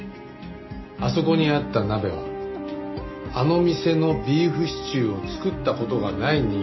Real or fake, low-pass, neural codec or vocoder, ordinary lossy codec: real; 7.2 kHz; none; MP3, 24 kbps